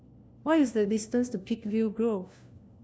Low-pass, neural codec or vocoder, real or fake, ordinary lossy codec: none; codec, 16 kHz, 1 kbps, FunCodec, trained on LibriTTS, 50 frames a second; fake; none